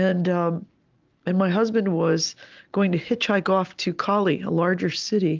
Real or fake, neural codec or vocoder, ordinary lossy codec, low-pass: real; none; Opus, 24 kbps; 7.2 kHz